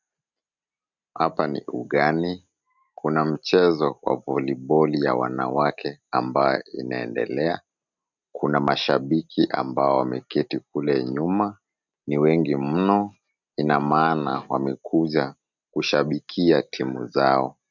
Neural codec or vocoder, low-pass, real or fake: none; 7.2 kHz; real